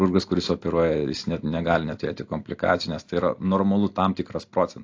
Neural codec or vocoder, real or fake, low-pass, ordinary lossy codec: none; real; 7.2 kHz; AAC, 48 kbps